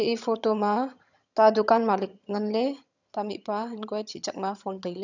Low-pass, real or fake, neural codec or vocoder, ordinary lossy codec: 7.2 kHz; fake; vocoder, 22.05 kHz, 80 mel bands, HiFi-GAN; none